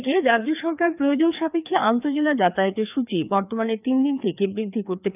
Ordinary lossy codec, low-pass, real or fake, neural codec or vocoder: none; 3.6 kHz; fake; codec, 16 kHz, 2 kbps, FreqCodec, larger model